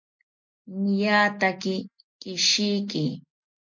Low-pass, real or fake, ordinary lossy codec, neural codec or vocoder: 7.2 kHz; real; MP3, 48 kbps; none